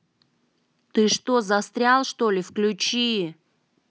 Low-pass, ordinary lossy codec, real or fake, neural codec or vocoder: none; none; real; none